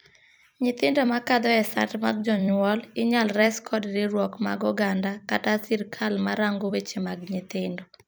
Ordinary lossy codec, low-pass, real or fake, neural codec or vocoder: none; none; fake; vocoder, 44.1 kHz, 128 mel bands every 256 samples, BigVGAN v2